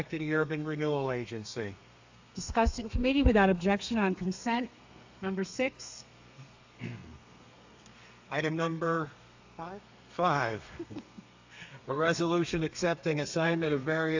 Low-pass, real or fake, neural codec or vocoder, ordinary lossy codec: 7.2 kHz; fake; codec, 32 kHz, 1.9 kbps, SNAC; AAC, 48 kbps